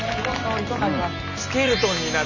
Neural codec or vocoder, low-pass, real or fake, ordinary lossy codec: none; 7.2 kHz; real; none